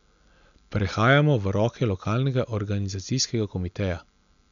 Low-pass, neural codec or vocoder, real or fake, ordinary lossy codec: 7.2 kHz; none; real; none